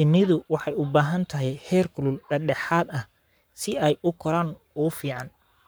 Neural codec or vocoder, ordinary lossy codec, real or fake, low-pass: codec, 44.1 kHz, 7.8 kbps, Pupu-Codec; none; fake; none